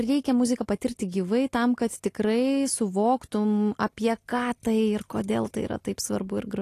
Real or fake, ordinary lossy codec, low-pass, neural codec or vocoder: real; AAC, 48 kbps; 14.4 kHz; none